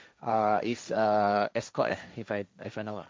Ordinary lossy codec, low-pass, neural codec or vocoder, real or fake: none; none; codec, 16 kHz, 1.1 kbps, Voila-Tokenizer; fake